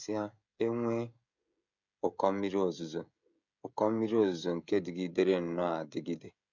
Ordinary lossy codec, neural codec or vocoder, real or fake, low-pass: none; codec, 16 kHz, 16 kbps, FreqCodec, smaller model; fake; 7.2 kHz